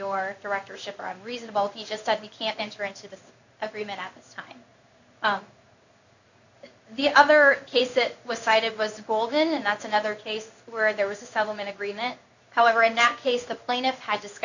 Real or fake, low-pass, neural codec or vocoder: fake; 7.2 kHz; codec, 16 kHz in and 24 kHz out, 1 kbps, XY-Tokenizer